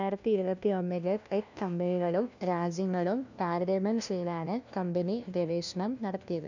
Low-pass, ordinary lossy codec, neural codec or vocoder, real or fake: 7.2 kHz; none; codec, 16 kHz, 1 kbps, FunCodec, trained on LibriTTS, 50 frames a second; fake